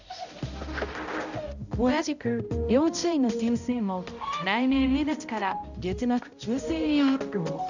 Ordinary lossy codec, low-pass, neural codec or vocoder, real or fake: none; 7.2 kHz; codec, 16 kHz, 0.5 kbps, X-Codec, HuBERT features, trained on balanced general audio; fake